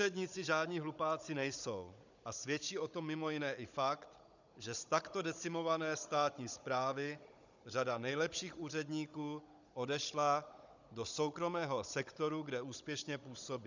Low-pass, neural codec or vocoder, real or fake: 7.2 kHz; codec, 16 kHz, 16 kbps, FunCodec, trained on Chinese and English, 50 frames a second; fake